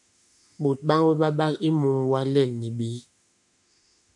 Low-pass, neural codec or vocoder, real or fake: 10.8 kHz; autoencoder, 48 kHz, 32 numbers a frame, DAC-VAE, trained on Japanese speech; fake